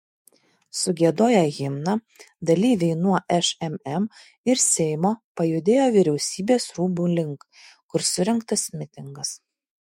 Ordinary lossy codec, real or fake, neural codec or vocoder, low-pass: MP3, 64 kbps; fake; autoencoder, 48 kHz, 128 numbers a frame, DAC-VAE, trained on Japanese speech; 19.8 kHz